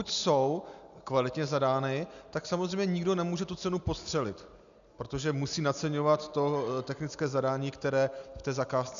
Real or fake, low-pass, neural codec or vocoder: real; 7.2 kHz; none